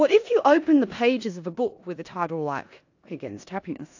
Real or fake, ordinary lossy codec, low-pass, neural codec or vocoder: fake; AAC, 48 kbps; 7.2 kHz; codec, 16 kHz in and 24 kHz out, 0.9 kbps, LongCat-Audio-Codec, four codebook decoder